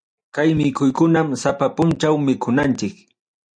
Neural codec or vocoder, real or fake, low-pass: none; real; 9.9 kHz